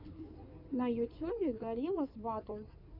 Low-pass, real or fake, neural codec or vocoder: 5.4 kHz; fake; codec, 16 kHz in and 24 kHz out, 2.2 kbps, FireRedTTS-2 codec